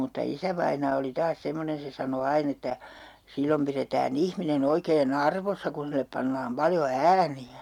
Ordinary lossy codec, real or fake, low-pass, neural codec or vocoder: none; real; 19.8 kHz; none